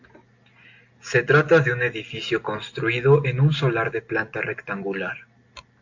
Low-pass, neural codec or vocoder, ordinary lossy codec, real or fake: 7.2 kHz; none; AAC, 48 kbps; real